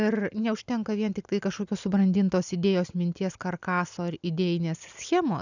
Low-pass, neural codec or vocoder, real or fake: 7.2 kHz; none; real